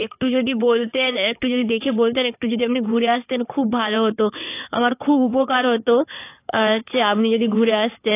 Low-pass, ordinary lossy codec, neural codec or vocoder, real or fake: 3.6 kHz; AAC, 24 kbps; codec, 16 kHz in and 24 kHz out, 2.2 kbps, FireRedTTS-2 codec; fake